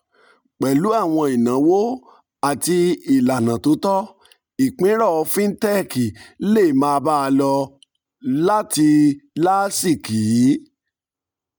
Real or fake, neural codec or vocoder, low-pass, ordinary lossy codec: real; none; none; none